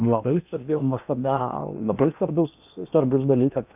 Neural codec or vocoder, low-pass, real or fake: codec, 16 kHz in and 24 kHz out, 0.8 kbps, FocalCodec, streaming, 65536 codes; 3.6 kHz; fake